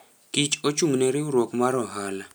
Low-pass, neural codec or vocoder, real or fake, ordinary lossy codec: none; none; real; none